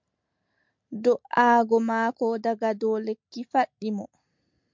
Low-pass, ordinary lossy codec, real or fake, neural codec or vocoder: 7.2 kHz; MP3, 64 kbps; real; none